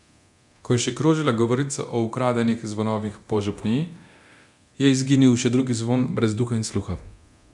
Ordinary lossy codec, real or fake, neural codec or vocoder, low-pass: none; fake; codec, 24 kHz, 0.9 kbps, DualCodec; 10.8 kHz